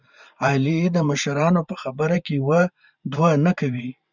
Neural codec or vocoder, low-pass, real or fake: vocoder, 44.1 kHz, 128 mel bands every 512 samples, BigVGAN v2; 7.2 kHz; fake